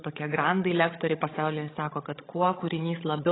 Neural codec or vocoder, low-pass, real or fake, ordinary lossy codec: codec, 16 kHz, 16 kbps, FreqCodec, larger model; 7.2 kHz; fake; AAC, 16 kbps